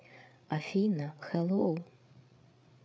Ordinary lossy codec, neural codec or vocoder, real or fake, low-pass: none; codec, 16 kHz, 8 kbps, FreqCodec, larger model; fake; none